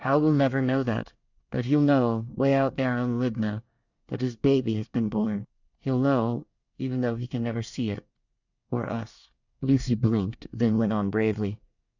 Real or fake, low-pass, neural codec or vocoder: fake; 7.2 kHz; codec, 24 kHz, 1 kbps, SNAC